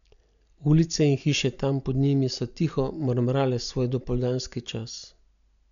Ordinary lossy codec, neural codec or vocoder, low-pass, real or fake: none; none; 7.2 kHz; real